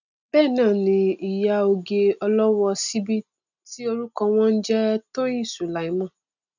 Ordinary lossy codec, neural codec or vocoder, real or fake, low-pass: none; none; real; 7.2 kHz